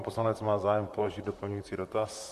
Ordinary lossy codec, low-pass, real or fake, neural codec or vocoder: MP3, 64 kbps; 14.4 kHz; fake; vocoder, 44.1 kHz, 128 mel bands, Pupu-Vocoder